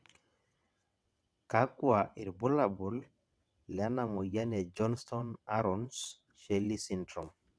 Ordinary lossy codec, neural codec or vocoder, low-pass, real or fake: none; vocoder, 22.05 kHz, 80 mel bands, WaveNeXt; none; fake